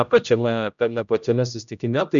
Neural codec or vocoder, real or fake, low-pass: codec, 16 kHz, 0.5 kbps, X-Codec, HuBERT features, trained on general audio; fake; 7.2 kHz